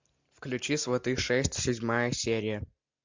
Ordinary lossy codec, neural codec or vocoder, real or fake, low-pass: MP3, 64 kbps; none; real; 7.2 kHz